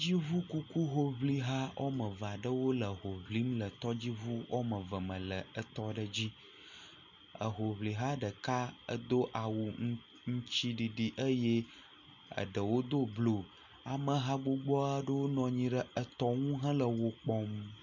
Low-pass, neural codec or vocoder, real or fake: 7.2 kHz; none; real